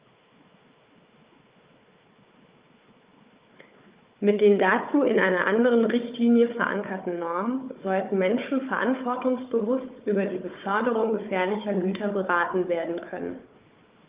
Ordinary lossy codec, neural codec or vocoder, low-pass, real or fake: Opus, 24 kbps; codec, 16 kHz, 4 kbps, FunCodec, trained on Chinese and English, 50 frames a second; 3.6 kHz; fake